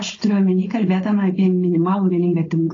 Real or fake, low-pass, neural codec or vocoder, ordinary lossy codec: fake; 7.2 kHz; codec, 16 kHz, 4.8 kbps, FACodec; AAC, 32 kbps